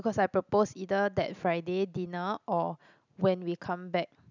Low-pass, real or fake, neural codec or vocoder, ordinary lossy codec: 7.2 kHz; real; none; none